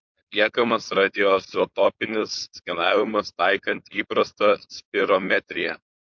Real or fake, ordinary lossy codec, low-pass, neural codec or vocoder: fake; MP3, 64 kbps; 7.2 kHz; codec, 16 kHz, 4.8 kbps, FACodec